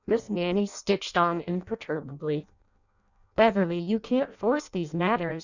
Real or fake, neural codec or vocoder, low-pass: fake; codec, 16 kHz in and 24 kHz out, 0.6 kbps, FireRedTTS-2 codec; 7.2 kHz